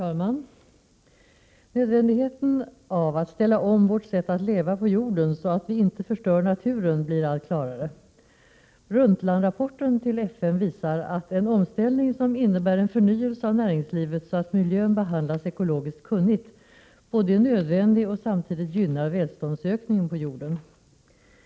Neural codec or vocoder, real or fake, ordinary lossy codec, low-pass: none; real; none; none